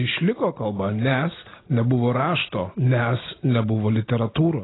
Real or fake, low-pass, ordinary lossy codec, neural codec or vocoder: real; 7.2 kHz; AAC, 16 kbps; none